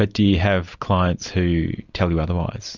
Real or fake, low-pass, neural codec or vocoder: real; 7.2 kHz; none